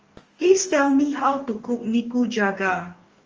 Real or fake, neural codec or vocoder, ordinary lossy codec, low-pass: fake; codec, 44.1 kHz, 2.6 kbps, DAC; Opus, 24 kbps; 7.2 kHz